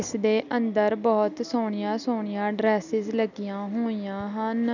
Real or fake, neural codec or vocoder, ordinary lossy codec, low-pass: real; none; none; 7.2 kHz